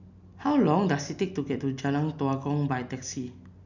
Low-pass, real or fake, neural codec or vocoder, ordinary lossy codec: 7.2 kHz; real; none; none